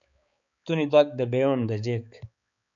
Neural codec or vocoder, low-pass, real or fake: codec, 16 kHz, 4 kbps, X-Codec, HuBERT features, trained on balanced general audio; 7.2 kHz; fake